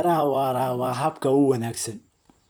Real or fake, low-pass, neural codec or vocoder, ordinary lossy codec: fake; none; vocoder, 44.1 kHz, 128 mel bands, Pupu-Vocoder; none